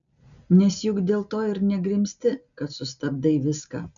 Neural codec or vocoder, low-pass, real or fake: none; 7.2 kHz; real